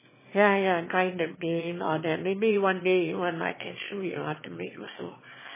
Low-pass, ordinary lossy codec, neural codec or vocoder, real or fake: 3.6 kHz; MP3, 16 kbps; autoencoder, 22.05 kHz, a latent of 192 numbers a frame, VITS, trained on one speaker; fake